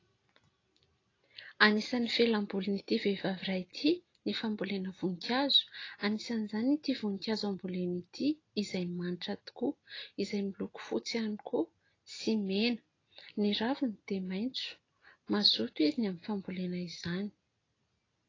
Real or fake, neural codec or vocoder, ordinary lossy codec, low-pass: real; none; AAC, 32 kbps; 7.2 kHz